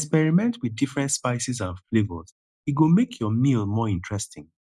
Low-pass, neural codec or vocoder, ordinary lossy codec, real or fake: none; none; none; real